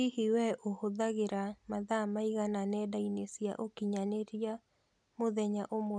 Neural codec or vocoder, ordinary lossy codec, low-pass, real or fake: none; none; none; real